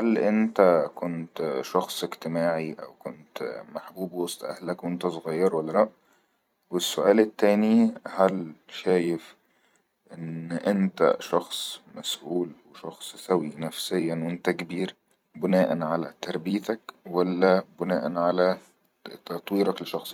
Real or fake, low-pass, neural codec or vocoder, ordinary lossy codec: fake; 19.8 kHz; vocoder, 44.1 kHz, 128 mel bands every 256 samples, BigVGAN v2; none